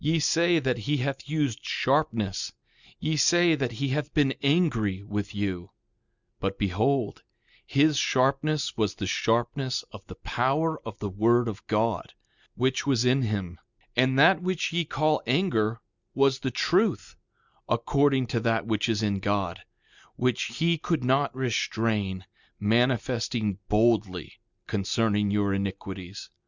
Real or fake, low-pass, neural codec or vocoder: real; 7.2 kHz; none